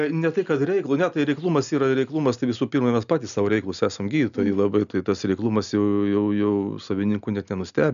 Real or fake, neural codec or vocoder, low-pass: real; none; 7.2 kHz